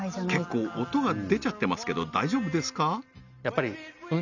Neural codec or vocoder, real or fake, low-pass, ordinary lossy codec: none; real; 7.2 kHz; none